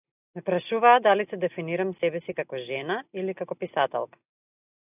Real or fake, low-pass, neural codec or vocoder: real; 3.6 kHz; none